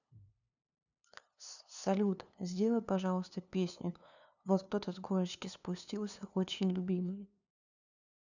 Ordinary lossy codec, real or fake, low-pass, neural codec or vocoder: none; fake; 7.2 kHz; codec, 16 kHz, 2 kbps, FunCodec, trained on LibriTTS, 25 frames a second